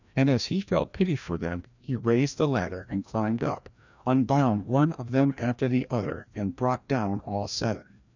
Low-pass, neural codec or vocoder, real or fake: 7.2 kHz; codec, 16 kHz, 1 kbps, FreqCodec, larger model; fake